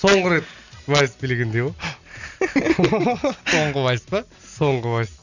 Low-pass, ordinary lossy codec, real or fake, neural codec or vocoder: 7.2 kHz; none; real; none